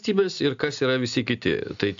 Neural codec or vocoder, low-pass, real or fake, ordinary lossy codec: none; 7.2 kHz; real; AAC, 64 kbps